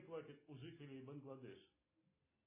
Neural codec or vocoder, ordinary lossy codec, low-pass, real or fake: none; MP3, 16 kbps; 3.6 kHz; real